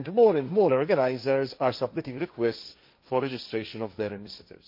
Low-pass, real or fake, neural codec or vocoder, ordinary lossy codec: 5.4 kHz; fake; codec, 16 kHz, 1.1 kbps, Voila-Tokenizer; MP3, 32 kbps